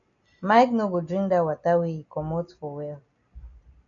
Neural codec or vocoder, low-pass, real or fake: none; 7.2 kHz; real